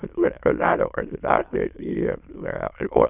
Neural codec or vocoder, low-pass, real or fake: autoencoder, 22.05 kHz, a latent of 192 numbers a frame, VITS, trained on many speakers; 3.6 kHz; fake